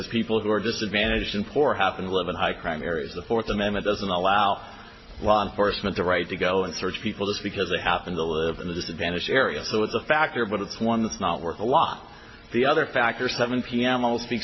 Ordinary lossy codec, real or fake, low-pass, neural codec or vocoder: MP3, 24 kbps; real; 7.2 kHz; none